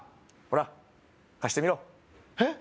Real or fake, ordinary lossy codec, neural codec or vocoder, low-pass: real; none; none; none